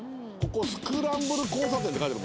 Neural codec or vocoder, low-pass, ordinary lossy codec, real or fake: none; none; none; real